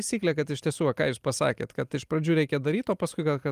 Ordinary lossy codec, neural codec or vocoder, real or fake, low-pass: Opus, 32 kbps; none; real; 14.4 kHz